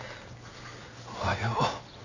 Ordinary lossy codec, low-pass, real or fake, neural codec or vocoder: none; 7.2 kHz; real; none